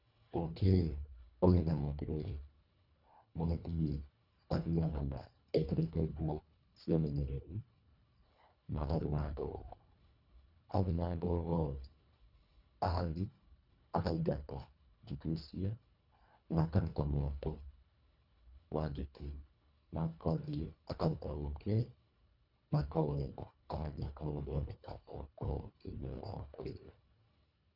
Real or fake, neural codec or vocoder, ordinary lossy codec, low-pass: fake; codec, 24 kHz, 1.5 kbps, HILCodec; none; 5.4 kHz